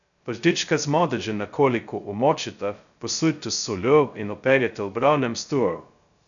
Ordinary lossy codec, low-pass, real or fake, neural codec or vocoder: none; 7.2 kHz; fake; codec, 16 kHz, 0.2 kbps, FocalCodec